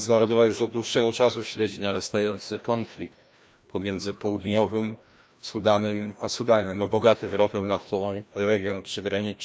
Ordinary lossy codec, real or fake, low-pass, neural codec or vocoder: none; fake; none; codec, 16 kHz, 1 kbps, FreqCodec, larger model